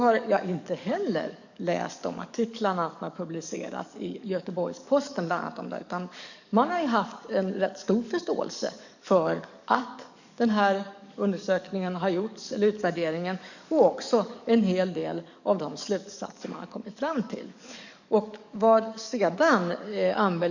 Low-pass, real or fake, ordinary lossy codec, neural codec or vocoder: 7.2 kHz; fake; none; codec, 44.1 kHz, 7.8 kbps, DAC